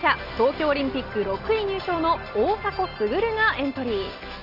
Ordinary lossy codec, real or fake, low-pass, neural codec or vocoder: Opus, 32 kbps; real; 5.4 kHz; none